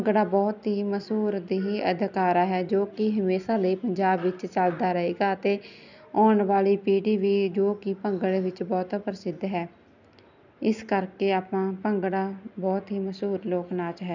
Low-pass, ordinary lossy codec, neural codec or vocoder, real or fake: 7.2 kHz; none; none; real